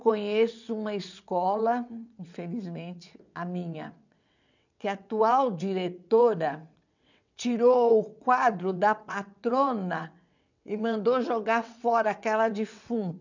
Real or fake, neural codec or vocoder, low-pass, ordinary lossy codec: fake; vocoder, 22.05 kHz, 80 mel bands, WaveNeXt; 7.2 kHz; none